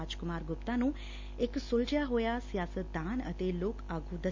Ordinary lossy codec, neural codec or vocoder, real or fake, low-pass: MP3, 48 kbps; none; real; 7.2 kHz